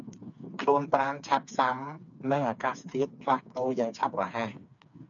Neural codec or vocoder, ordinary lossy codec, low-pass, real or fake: codec, 16 kHz, 4 kbps, FreqCodec, smaller model; MP3, 96 kbps; 7.2 kHz; fake